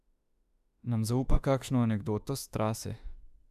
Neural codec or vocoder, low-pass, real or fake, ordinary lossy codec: autoencoder, 48 kHz, 32 numbers a frame, DAC-VAE, trained on Japanese speech; 14.4 kHz; fake; none